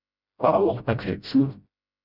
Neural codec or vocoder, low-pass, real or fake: codec, 16 kHz, 0.5 kbps, FreqCodec, smaller model; 5.4 kHz; fake